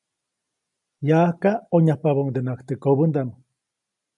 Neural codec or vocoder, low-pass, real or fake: none; 10.8 kHz; real